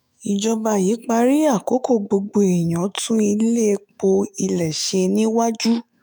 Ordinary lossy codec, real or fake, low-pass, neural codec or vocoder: none; fake; none; autoencoder, 48 kHz, 128 numbers a frame, DAC-VAE, trained on Japanese speech